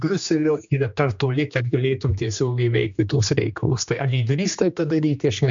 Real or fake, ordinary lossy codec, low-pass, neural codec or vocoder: fake; MP3, 64 kbps; 7.2 kHz; codec, 16 kHz, 2 kbps, X-Codec, HuBERT features, trained on general audio